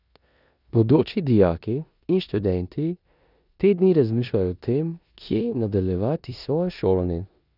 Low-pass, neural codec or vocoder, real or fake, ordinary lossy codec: 5.4 kHz; codec, 16 kHz in and 24 kHz out, 0.9 kbps, LongCat-Audio-Codec, four codebook decoder; fake; none